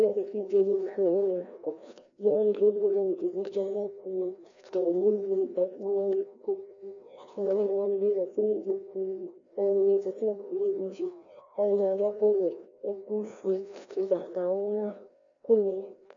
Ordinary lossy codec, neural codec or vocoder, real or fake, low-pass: MP3, 64 kbps; codec, 16 kHz, 1 kbps, FreqCodec, larger model; fake; 7.2 kHz